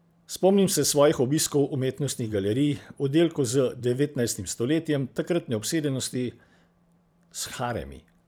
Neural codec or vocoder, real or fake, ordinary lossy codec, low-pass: vocoder, 44.1 kHz, 128 mel bands every 512 samples, BigVGAN v2; fake; none; none